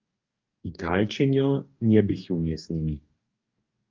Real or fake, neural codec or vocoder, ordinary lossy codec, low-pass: fake; codec, 44.1 kHz, 2.6 kbps, DAC; Opus, 24 kbps; 7.2 kHz